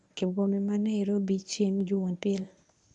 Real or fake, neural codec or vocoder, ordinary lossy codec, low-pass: fake; codec, 24 kHz, 0.9 kbps, WavTokenizer, medium speech release version 1; none; 10.8 kHz